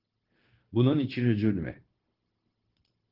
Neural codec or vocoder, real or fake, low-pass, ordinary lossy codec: codec, 16 kHz, 0.9 kbps, LongCat-Audio-Codec; fake; 5.4 kHz; Opus, 16 kbps